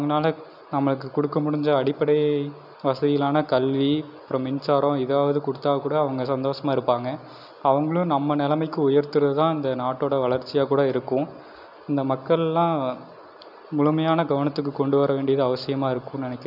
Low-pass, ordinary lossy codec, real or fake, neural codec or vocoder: 5.4 kHz; none; real; none